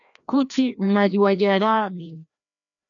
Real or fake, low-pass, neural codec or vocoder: fake; 7.2 kHz; codec, 16 kHz, 1 kbps, FreqCodec, larger model